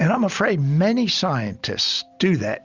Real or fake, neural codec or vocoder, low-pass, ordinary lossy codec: real; none; 7.2 kHz; Opus, 64 kbps